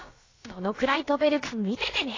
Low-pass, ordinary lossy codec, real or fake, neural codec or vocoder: 7.2 kHz; AAC, 32 kbps; fake; codec, 16 kHz, about 1 kbps, DyCAST, with the encoder's durations